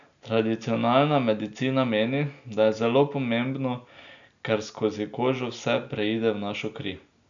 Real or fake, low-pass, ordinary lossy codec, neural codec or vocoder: real; 7.2 kHz; none; none